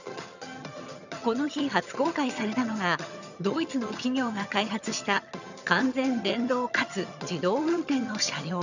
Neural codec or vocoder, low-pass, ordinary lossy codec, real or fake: vocoder, 22.05 kHz, 80 mel bands, HiFi-GAN; 7.2 kHz; none; fake